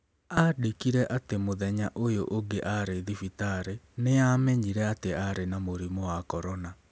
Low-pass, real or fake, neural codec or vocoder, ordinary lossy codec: none; real; none; none